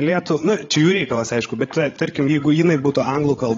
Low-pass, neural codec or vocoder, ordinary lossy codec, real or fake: 7.2 kHz; codec, 16 kHz, 8 kbps, FreqCodec, larger model; AAC, 32 kbps; fake